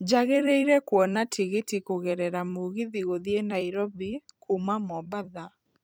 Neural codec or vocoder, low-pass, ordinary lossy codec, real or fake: vocoder, 44.1 kHz, 128 mel bands every 256 samples, BigVGAN v2; none; none; fake